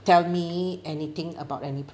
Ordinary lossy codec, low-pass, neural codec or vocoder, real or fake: none; none; none; real